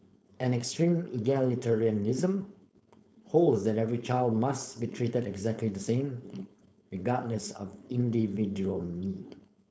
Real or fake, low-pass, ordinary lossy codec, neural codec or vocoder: fake; none; none; codec, 16 kHz, 4.8 kbps, FACodec